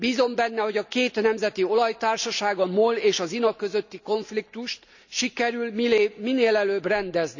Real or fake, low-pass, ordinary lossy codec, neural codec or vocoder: real; 7.2 kHz; none; none